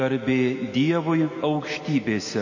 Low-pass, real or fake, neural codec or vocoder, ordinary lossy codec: 7.2 kHz; real; none; MP3, 32 kbps